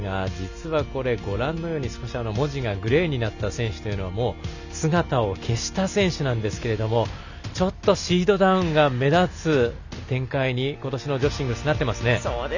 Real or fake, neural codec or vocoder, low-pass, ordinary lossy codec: real; none; 7.2 kHz; none